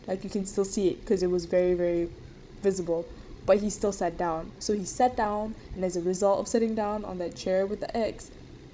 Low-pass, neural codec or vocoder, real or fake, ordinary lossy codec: none; codec, 16 kHz, 16 kbps, FreqCodec, larger model; fake; none